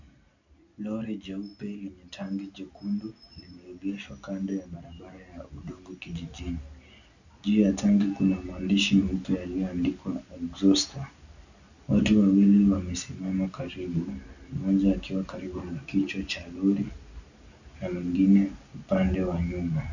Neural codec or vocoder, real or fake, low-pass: none; real; 7.2 kHz